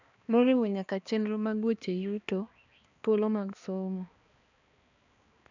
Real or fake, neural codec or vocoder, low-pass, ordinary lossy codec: fake; codec, 16 kHz, 2 kbps, X-Codec, HuBERT features, trained on balanced general audio; 7.2 kHz; none